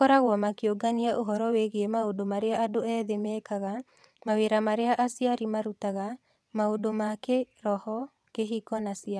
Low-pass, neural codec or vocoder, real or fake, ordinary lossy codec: none; vocoder, 22.05 kHz, 80 mel bands, WaveNeXt; fake; none